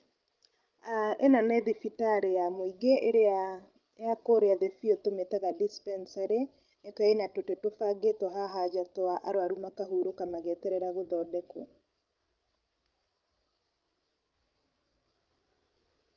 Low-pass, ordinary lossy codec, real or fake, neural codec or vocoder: 7.2 kHz; Opus, 24 kbps; fake; codec, 16 kHz, 16 kbps, FreqCodec, larger model